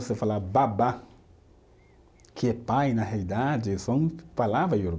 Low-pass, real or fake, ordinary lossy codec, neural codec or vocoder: none; real; none; none